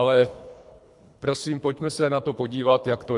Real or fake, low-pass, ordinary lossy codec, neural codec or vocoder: fake; 10.8 kHz; AAC, 64 kbps; codec, 24 kHz, 3 kbps, HILCodec